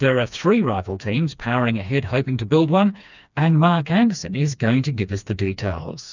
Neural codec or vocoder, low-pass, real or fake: codec, 16 kHz, 2 kbps, FreqCodec, smaller model; 7.2 kHz; fake